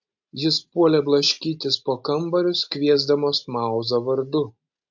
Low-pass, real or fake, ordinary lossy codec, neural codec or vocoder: 7.2 kHz; real; MP3, 48 kbps; none